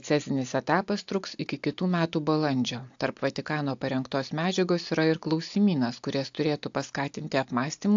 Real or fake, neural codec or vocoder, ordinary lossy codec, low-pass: real; none; AAC, 64 kbps; 7.2 kHz